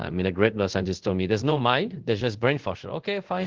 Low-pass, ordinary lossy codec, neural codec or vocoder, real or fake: 7.2 kHz; Opus, 16 kbps; codec, 24 kHz, 0.5 kbps, DualCodec; fake